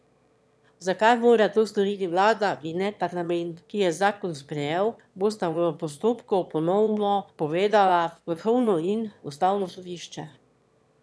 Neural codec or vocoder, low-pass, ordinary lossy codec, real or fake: autoencoder, 22.05 kHz, a latent of 192 numbers a frame, VITS, trained on one speaker; none; none; fake